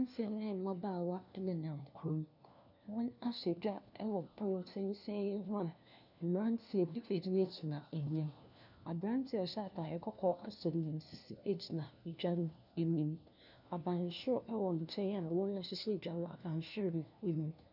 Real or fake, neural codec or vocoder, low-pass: fake; codec, 16 kHz, 1 kbps, FunCodec, trained on LibriTTS, 50 frames a second; 5.4 kHz